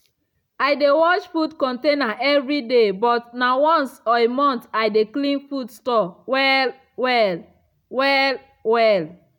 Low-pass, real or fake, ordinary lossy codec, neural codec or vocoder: 19.8 kHz; real; none; none